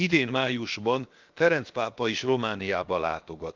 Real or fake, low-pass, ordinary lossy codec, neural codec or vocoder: fake; 7.2 kHz; Opus, 32 kbps; codec, 16 kHz, about 1 kbps, DyCAST, with the encoder's durations